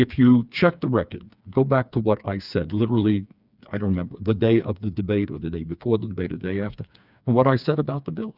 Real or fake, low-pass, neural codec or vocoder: fake; 5.4 kHz; codec, 16 kHz, 4 kbps, FreqCodec, smaller model